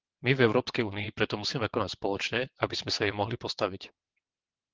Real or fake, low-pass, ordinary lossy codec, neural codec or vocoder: fake; 7.2 kHz; Opus, 16 kbps; vocoder, 22.05 kHz, 80 mel bands, WaveNeXt